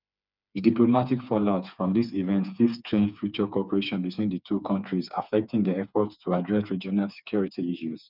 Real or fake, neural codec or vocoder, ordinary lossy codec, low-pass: fake; codec, 16 kHz, 4 kbps, FreqCodec, smaller model; none; 5.4 kHz